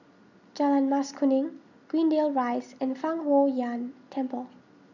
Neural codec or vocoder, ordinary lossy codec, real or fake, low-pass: none; none; real; 7.2 kHz